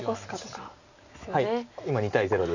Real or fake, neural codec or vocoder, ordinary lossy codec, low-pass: real; none; none; 7.2 kHz